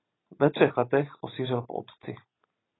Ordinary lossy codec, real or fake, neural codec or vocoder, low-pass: AAC, 16 kbps; real; none; 7.2 kHz